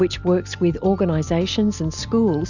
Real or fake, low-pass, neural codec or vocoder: real; 7.2 kHz; none